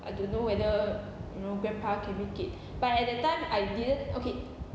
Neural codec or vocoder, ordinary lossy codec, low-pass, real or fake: none; none; none; real